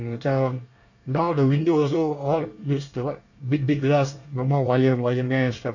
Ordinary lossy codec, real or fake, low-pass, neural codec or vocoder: none; fake; 7.2 kHz; codec, 24 kHz, 1 kbps, SNAC